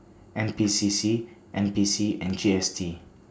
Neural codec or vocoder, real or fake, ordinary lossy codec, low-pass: none; real; none; none